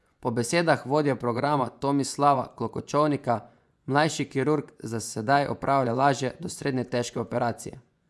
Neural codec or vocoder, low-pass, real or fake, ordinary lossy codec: vocoder, 24 kHz, 100 mel bands, Vocos; none; fake; none